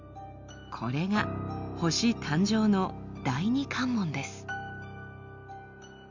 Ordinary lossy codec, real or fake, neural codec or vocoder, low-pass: none; real; none; 7.2 kHz